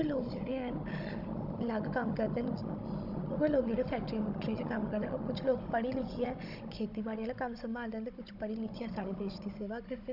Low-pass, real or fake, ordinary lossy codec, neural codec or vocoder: 5.4 kHz; fake; none; codec, 16 kHz, 16 kbps, FunCodec, trained on Chinese and English, 50 frames a second